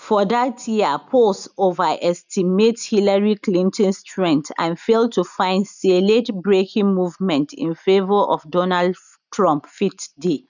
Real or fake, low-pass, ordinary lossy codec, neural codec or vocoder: real; 7.2 kHz; none; none